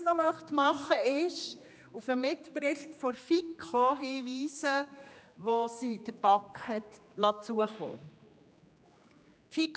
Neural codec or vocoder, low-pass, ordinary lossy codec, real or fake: codec, 16 kHz, 2 kbps, X-Codec, HuBERT features, trained on general audio; none; none; fake